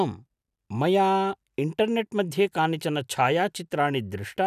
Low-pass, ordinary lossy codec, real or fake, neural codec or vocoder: 14.4 kHz; none; real; none